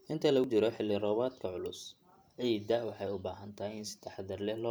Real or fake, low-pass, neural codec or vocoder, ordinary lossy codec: fake; none; vocoder, 44.1 kHz, 128 mel bands every 512 samples, BigVGAN v2; none